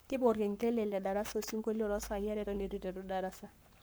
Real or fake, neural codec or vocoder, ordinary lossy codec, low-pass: fake; codec, 44.1 kHz, 7.8 kbps, Pupu-Codec; none; none